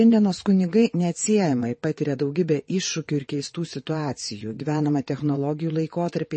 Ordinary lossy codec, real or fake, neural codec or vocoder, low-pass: MP3, 32 kbps; fake; vocoder, 22.05 kHz, 80 mel bands, WaveNeXt; 9.9 kHz